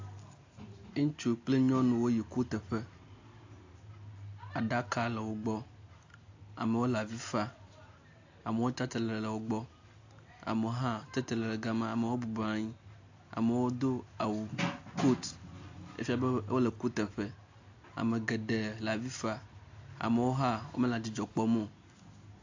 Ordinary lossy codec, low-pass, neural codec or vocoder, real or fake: AAC, 48 kbps; 7.2 kHz; none; real